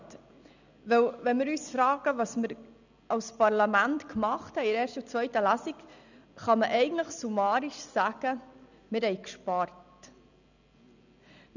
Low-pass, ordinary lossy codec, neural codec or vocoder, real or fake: 7.2 kHz; none; none; real